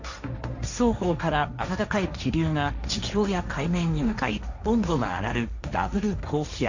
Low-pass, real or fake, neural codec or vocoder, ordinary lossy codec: 7.2 kHz; fake; codec, 16 kHz, 1.1 kbps, Voila-Tokenizer; none